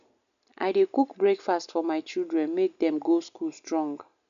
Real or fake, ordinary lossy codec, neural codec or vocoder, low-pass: real; none; none; 7.2 kHz